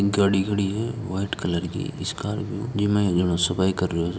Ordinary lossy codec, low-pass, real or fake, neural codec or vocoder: none; none; real; none